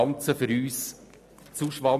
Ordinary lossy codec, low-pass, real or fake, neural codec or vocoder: none; 14.4 kHz; real; none